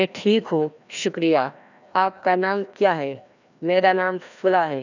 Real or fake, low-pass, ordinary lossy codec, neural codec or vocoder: fake; 7.2 kHz; none; codec, 16 kHz, 1 kbps, FreqCodec, larger model